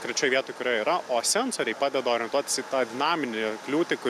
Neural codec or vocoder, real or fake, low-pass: none; real; 14.4 kHz